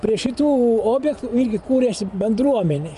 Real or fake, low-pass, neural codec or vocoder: fake; 10.8 kHz; vocoder, 24 kHz, 100 mel bands, Vocos